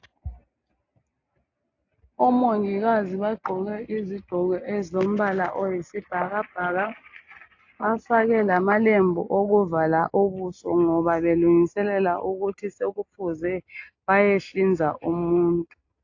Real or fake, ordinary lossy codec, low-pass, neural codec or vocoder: real; AAC, 48 kbps; 7.2 kHz; none